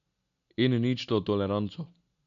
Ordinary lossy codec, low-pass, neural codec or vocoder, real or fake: none; 7.2 kHz; none; real